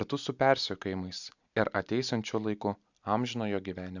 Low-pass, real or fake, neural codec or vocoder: 7.2 kHz; real; none